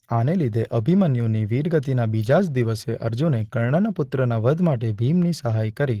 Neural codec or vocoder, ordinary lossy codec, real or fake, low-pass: none; Opus, 16 kbps; real; 14.4 kHz